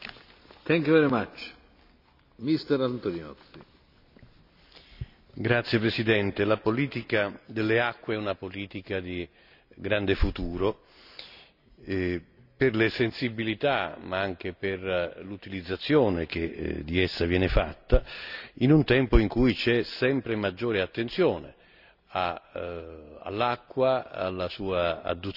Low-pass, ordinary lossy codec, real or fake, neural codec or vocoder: 5.4 kHz; none; real; none